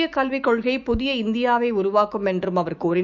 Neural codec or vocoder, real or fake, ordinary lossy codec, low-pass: autoencoder, 48 kHz, 128 numbers a frame, DAC-VAE, trained on Japanese speech; fake; none; 7.2 kHz